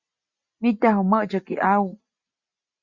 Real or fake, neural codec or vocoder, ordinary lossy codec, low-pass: real; none; Opus, 64 kbps; 7.2 kHz